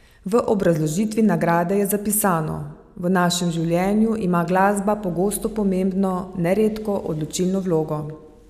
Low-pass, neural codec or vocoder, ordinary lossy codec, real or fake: 14.4 kHz; none; none; real